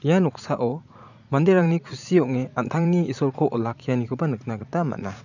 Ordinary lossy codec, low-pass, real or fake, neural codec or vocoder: none; 7.2 kHz; fake; vocoder, 44.1 kHz, 80 mel bands, Vocos